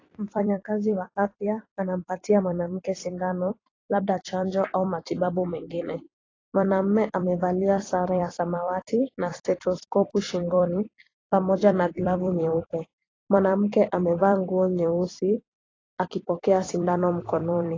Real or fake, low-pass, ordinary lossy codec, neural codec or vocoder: fake; 7.2 kHz; AAC, 32 kbps; vocoder, 44.1 kHz, 128 mel bands every 256 samples, BigVGAN v2